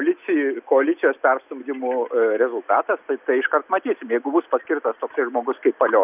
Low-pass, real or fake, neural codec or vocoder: 3.6 kHz; real; none